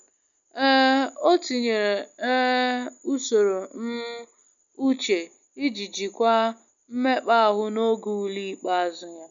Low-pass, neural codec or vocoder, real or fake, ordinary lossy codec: 7.2 kHz; none; real; none